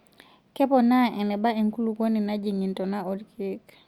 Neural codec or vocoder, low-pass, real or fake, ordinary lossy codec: none; 19.8 kHz; real; Opus, 64 kbps